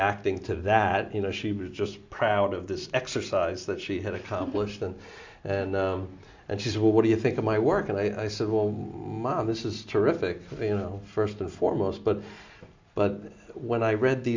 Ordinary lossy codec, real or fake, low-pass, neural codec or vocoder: MP3, 64 kbps; real; 7.2 kHz; none